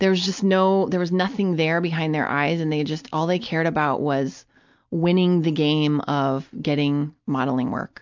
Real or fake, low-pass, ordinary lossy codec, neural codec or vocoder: real; 7.2 kHz; MP3, 64 kbps; none